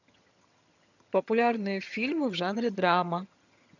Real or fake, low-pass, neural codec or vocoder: fake; 7.2 kHz; vocoder, 22.05 kHz, 80 mel bands, HiFi-GAN